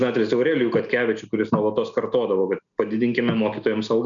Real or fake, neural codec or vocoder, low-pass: real; none; 7.2 kHz